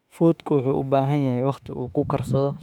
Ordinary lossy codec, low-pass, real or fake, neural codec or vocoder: none; 19.8 kHz; fake; autoencoder, 48 kHz, 32 numbers a frame, DAC-VAE, trained on Japanese speech